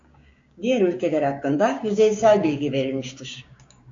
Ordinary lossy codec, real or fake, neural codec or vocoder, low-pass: AAC, 48 kbps; fake; codec, 16 kHz, 6 kbps, DAC; 7.2 kHz